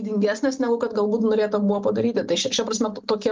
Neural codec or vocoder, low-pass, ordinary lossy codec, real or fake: none; 7.2 kHz; Opus, 32 kbps; real